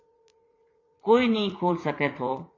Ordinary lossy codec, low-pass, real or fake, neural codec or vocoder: AAC, 32 kbps; 7.2 kHz; fake; vocoder, 44.1 kHz, 80 mel bands, Vocos